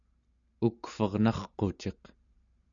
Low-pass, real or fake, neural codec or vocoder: 7.2 kHz; real; none